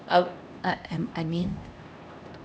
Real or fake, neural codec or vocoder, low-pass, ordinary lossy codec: fake; codec, 16 kHz, 1 kbps, X-Codec, HuBERT features, trained on LibriSpeech; none; none